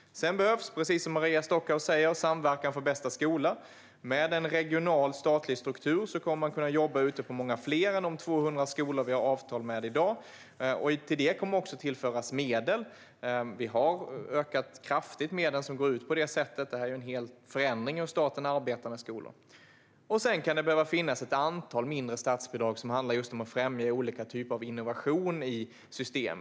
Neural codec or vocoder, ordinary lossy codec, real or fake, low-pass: none; none; real; none